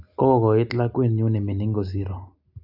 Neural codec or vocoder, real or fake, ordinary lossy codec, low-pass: none; real; AAC, 48 kbps; 5.4 kHz